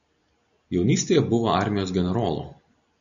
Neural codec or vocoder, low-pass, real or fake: none; 7.2 kHz; real